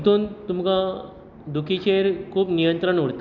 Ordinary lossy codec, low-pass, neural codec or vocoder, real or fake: none; 7.2 kHz; none; real